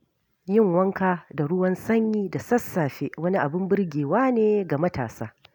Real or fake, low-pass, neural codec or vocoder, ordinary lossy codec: real; none; none; none